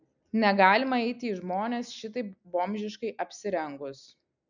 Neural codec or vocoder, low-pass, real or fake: none; 7.2 kHz; real